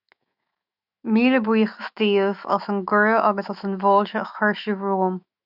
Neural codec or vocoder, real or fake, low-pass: autoencoder, 48 kHz, 128 numbers a frame, DAC-VAE, trained on Japanese speech; fake; 5.4 kHz